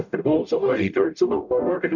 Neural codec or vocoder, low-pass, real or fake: codec, 44.1 kHz, 0.9 kbps, DAC; 7.2 kHz; fake